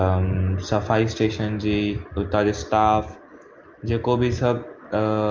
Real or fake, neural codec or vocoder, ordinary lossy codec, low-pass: real; none; Opus, 32 kbps; 7.2 kHz